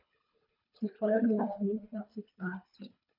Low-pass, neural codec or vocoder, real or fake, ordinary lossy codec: 5.4 kHz; codec, 24 kHz, 3 kbps, HILCodec; fake; none